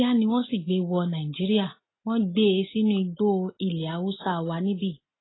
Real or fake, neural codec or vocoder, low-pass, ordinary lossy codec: real; none; 7.2 kHz; AAC, 16 kbps